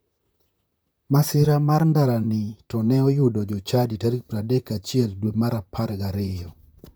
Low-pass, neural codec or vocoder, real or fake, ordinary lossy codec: none; vocoder, 44.1 kHz, 128 mel bands, Pupu-Vocoder; fake; none